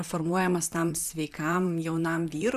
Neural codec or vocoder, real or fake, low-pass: vocoder, 44.1 kHz, 128 mel bands, Pupu-Vocoder; fake; 14.4 kHz